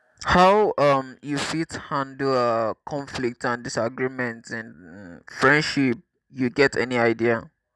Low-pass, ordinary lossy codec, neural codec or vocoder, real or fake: none; none; none; real